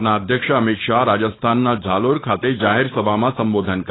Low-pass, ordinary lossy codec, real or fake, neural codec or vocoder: 7.2 kHz; AAC, 16 kbps; fake; codec, 16 kHz, 4.8 kbps, FACodec